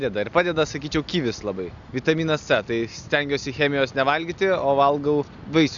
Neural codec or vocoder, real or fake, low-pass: none; real; 7.2 kHz